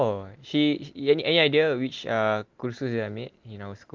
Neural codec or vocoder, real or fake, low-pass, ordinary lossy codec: none; real; 7.2 kHz; Opus, 32 kbps